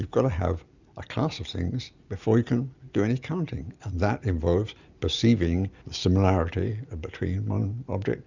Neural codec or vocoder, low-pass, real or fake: none; 7.2 kHz; real